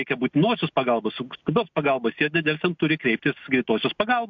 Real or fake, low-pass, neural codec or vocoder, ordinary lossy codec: real; 7.2 kHz; none; MP3, 64 kbps